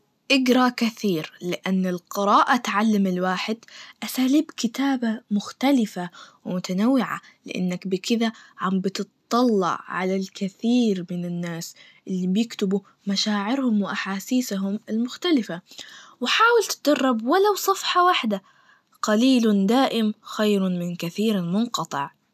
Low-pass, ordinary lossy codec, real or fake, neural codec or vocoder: 14.4 kHz; none; real; none